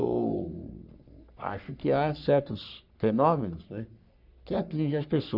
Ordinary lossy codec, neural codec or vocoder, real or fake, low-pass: none; codec, 44.1 kHz, 3.4 kbps, Pupu-Codec; fake; 5.4 kHz